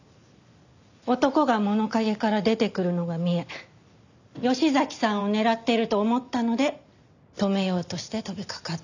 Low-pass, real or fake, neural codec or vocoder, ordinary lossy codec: 7.2 kHz; real; none; none